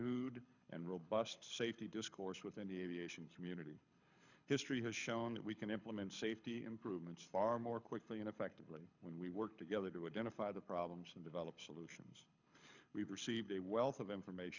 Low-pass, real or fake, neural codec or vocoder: 7.2 kHz; fake; codec, 24 kHz, 6 kbps, HILCodec